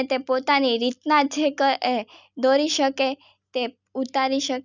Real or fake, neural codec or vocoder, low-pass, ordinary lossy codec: real; none; 7.2 kHz; none